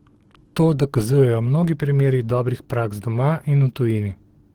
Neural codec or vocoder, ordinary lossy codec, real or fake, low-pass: codec, 44.1 kHz, 7.8 kbps, DAC; Opus, 16 kbps; fake; 19.8 kHz